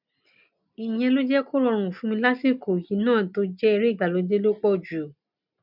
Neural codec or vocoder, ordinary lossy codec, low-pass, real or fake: none; none; 5.4 kHz; real